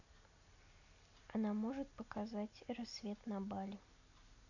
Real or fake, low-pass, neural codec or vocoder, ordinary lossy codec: real; 7.2 kHz; none; none